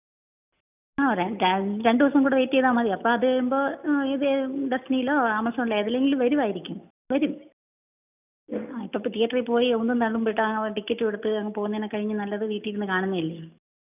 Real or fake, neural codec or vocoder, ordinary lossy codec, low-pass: real; none; none; 3.6 kHz